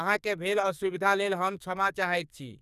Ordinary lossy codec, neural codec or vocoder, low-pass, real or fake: none; codec, 44.1 kHz, 2.6 kbps, SNAC; 14.4 kHz; fake